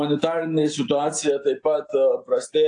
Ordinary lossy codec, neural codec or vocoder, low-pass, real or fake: AAC, 48 kbps; none; 9.9 kHz; real